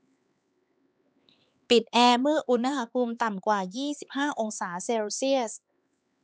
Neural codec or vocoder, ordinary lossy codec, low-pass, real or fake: codec, 16 kHz, 4 kbps, X-Codec, HuBERT features, trained on LibriSpeech; none; none; fake